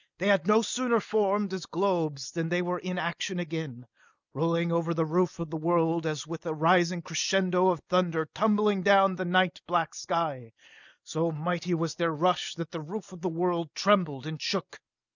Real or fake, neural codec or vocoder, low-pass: real; none; 7.2 kHz